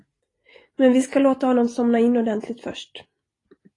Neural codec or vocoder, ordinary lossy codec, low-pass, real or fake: none; AAC, 32 kbps; 10.8 kHz; real